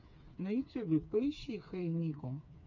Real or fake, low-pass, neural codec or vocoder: fake; 7.2 kHz; codec, 16 kHz, 4 kbps, FreqCodec, smaller model